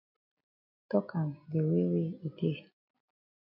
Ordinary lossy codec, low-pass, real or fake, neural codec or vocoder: MP3, 48 kbps; 5.4 kHz; real; none